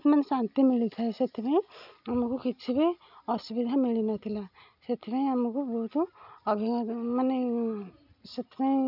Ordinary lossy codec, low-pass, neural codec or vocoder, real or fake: none; 5.4 kHz; none; real